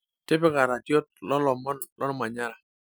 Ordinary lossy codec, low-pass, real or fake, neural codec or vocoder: none; none; real; none